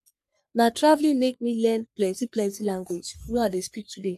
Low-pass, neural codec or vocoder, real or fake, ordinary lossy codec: 14.4 kHz; codec, 44.1 kHz, 3.4 kbps, Pupu-Codec; fake; AAC, 96 kbps